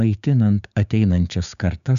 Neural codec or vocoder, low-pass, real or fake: codec, 16 kHz, 6 kbps, DAC; 7.2 kHz; fake